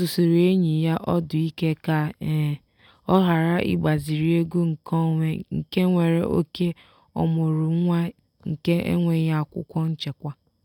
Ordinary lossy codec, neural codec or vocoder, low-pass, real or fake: none; none; 19.8 kHz; real